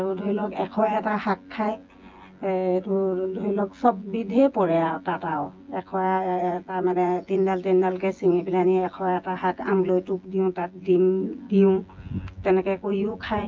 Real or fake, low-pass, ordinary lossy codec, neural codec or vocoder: fake; 7.2 kHz; Opus, 24 kbps; vocoder, 24 kHz, 100 mel bands, Vocos